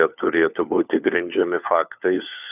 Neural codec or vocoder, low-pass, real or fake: codec, 16 kHz, 4 kbps, FunCodec, trained on LibriTTS, 50 frames a second; 3.6 kHz; fake